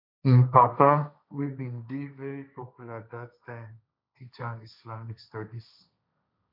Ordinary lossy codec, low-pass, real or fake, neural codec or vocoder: MP3, 48 kbps; 5.4 kHz; fake; codec, 16 kHz, 1.1 kbps, Voila-Tokenizer